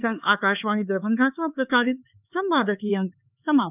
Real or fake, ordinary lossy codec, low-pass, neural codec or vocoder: fake; none; 3.6 kHz; codec, 16 kHz, 4 kbps, X-Codec, HuBERT features, trained on LibriSpeech